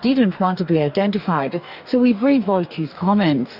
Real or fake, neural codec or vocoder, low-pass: fake; codec, 44.1 kHz, 2.6 kbps, DAC; 5.4 kHz